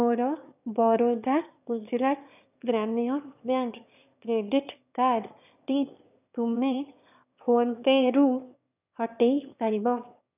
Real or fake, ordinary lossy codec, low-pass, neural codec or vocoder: fake; none; 3.6 kHz; autoencoder, 22.05 kHz, a latent of 192 numbers a frame, VITS, trained on one speaker